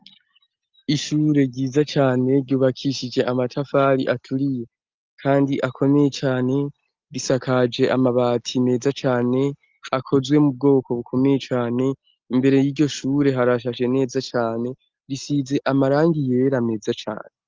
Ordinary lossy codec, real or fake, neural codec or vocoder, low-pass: Opus, 32 kbps; real; none; 7.2 kHz